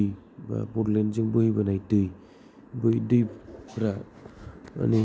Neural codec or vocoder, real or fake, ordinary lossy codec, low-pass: none; real; none; none